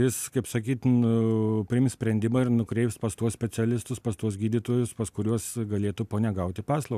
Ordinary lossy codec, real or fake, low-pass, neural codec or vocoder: AAC, 96 kbps; real; 14.4 kHz; none